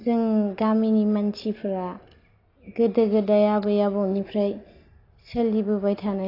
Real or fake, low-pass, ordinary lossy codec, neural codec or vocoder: real; 5.4 kHz; AAC, 32 kbps; none